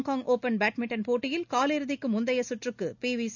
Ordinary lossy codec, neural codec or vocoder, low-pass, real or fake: none; none; 7.2 kHz; real